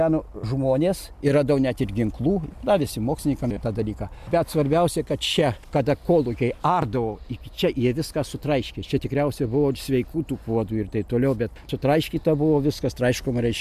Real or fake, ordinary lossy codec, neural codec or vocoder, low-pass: real; MP3, 96 kbps; none; 14.4 kHz